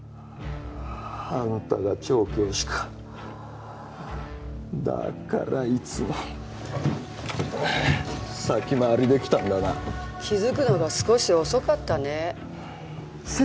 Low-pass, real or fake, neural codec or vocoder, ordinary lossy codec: none; real; none; none